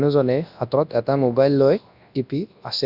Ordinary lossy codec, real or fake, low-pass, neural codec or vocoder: none; fake; 5.4 kHz; codec, 24 kHz, 0.9 kbps, WavTokenizer, large speech release